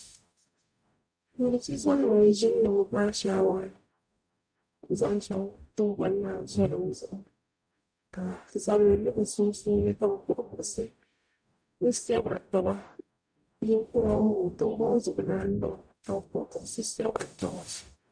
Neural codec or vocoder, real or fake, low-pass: codec, 44.1 kHz, 0.9 kbps, DAC; fake; 9.9 kHz